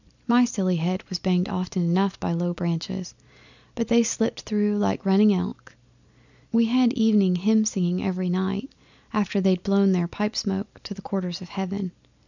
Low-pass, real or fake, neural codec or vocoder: 7.2 kHz; real; none